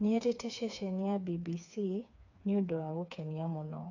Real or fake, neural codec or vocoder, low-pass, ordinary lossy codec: fake; codec, 16 kHz, 4 kbps, FreqCodec, smaller model; 7.2 kHz; none